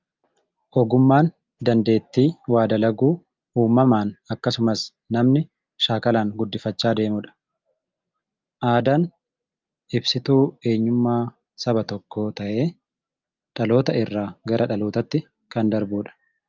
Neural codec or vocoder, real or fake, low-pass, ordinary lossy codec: none; real; 7.2 kHz; Opus, 24 kbps